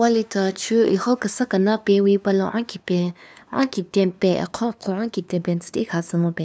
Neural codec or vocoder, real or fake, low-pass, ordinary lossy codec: codec, 16 kHz, 2 kbps, FunCodec, trained on LibriTTS, 25 frames a second; fake; none; none